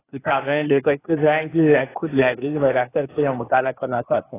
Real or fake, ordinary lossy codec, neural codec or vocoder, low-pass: fake; AAC, 16 kbps; codec, 16 kHz, 0.8 kbps, ZipCodec; 3.6 kHz